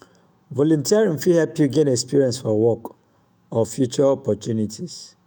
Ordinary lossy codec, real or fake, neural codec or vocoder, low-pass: none; fake; autoencoder, 48 kHz, 128 numbers a frame, DAC-VAE, trained on Japanese speech; none